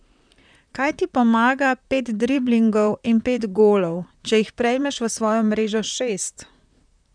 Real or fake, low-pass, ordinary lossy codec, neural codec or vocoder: fake; 9.9 kHz; none; vocoder, 44.1 kHz, 128 mel bands, Pupu-Vocoder